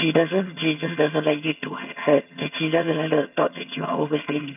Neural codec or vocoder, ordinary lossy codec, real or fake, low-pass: vocoder, 22.05 kHz, 80 mel bands, HiFi-GAN; none; fake; 3.6 kHz